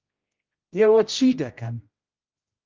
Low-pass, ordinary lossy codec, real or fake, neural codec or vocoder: 7.2 kHz; Opus, 24 kbps; fake; codec, 16 kHz, 0.5 kbps, X-Codec, HuBERT features, trained on general audio